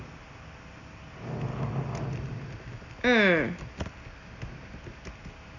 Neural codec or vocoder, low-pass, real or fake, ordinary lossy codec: none; 7.2 kHz; real; none